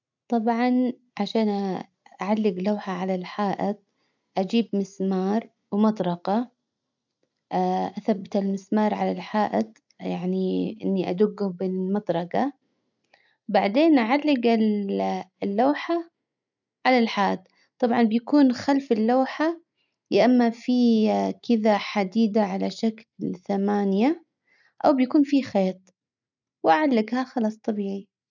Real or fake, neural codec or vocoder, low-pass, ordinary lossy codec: real; none; 7.2 kHz; none